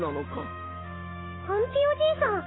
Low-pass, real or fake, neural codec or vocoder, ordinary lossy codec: 7.2 kHz; fake; autoencoder, 48 kHz, 128 numbers a frame, DAC-VAE, trained on Japanese speech; AAC, 16 kbps